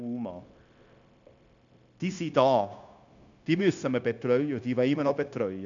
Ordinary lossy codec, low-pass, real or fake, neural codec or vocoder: none; 7.2 kHz; fake; codec, 16 kHz, 0.9 kbps, LongCat-Audio-Codec